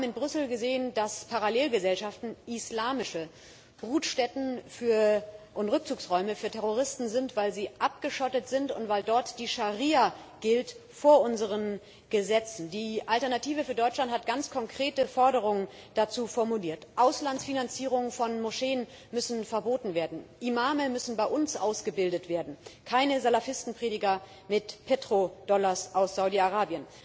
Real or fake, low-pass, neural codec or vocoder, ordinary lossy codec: real; none; none; none